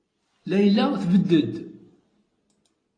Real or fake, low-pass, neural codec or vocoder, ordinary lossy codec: real; 9.9 kHz; none; AAC, 32 kbps